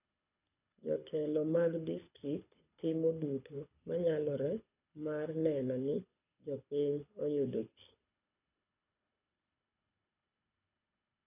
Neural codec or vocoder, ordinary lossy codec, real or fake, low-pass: codec, 24 kHz, 6 kbps, HILCodec; none; fake; 3.6 kHz